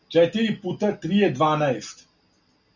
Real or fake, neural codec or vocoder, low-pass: real; none; 7.2 kHz